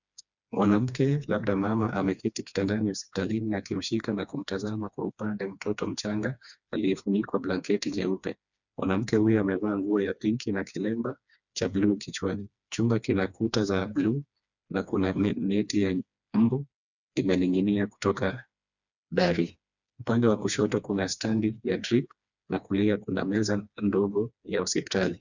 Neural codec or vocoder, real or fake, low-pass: codec, 16 kHz, 2 kbps, FreqCodec, smaller model; fake; 7.2 kHz